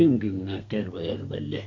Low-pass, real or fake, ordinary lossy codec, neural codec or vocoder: 7.2 kHz; fake; AAC, 48 kbps; codec, 32 kHz, 1.9 kbps, SNAC